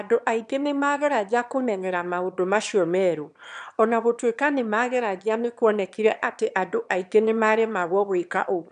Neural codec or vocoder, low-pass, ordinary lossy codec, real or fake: autoencoder, 22.05 kHz, a latent of 192 numbers a frame, VITS, trained on one speaker; 9.9 kHz; none; fake